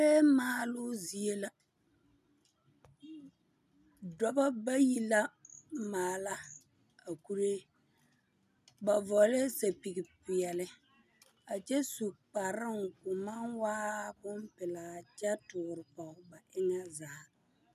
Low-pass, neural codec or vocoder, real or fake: 14.4 kHz; none; real